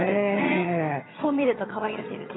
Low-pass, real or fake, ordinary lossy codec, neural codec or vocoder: 7.2 kHz; fake; AAC, 16 kbps; vocoder, 22.05 kHz, 80 mel bands, HiFi-GAN